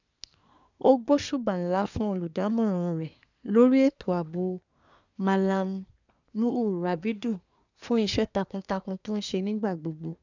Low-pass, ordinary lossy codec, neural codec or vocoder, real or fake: 7.2 kHz; none; codec, 24 kHz, 1 kbps, SNAC; fake